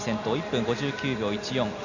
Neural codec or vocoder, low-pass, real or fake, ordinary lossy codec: none; 7.2 kHz; real; none